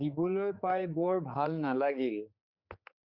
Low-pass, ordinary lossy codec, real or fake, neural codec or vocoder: 5.4 kHz; Opus, 64 kbps; fake; codec, 16 kHz, 4 kbps, X-Codec, HuBERT features, trained on general audio